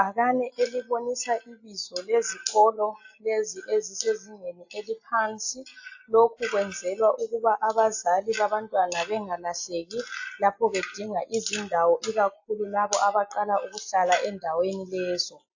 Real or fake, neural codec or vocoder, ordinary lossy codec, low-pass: real; none; AAC, 48 kbps; 7.2 kHz